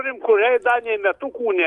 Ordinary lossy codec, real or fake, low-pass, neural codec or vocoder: Opus, 32 kbps; real; 10.8 kHz; none